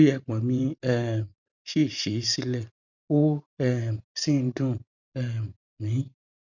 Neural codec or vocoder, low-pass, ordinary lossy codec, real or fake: vocoder, 44.1 kHz, 128 mel bands every 512 samples, BigVGAN v2; 7.2 kHz; none; fake